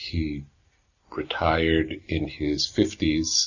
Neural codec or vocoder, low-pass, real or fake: none; 7.2 kHz; real